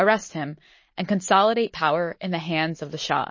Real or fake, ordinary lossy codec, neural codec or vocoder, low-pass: real; MP3, 32 kbps; none; 7.2 kHz